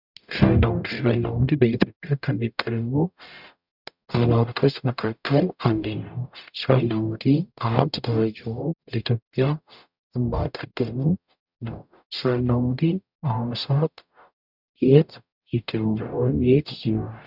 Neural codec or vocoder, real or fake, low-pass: codec, 44.1 kHz, 0.9 kbps, DAC; fake; 5.4 kHz